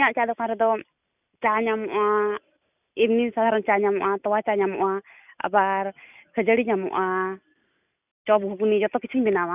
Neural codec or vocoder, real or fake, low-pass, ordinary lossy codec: none; real; 3.6 kHz; none